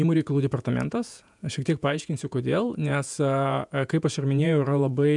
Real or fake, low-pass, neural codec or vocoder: fake; 10.8 kHz; vocoder, 48 kHz, 128 mel bands, Vocos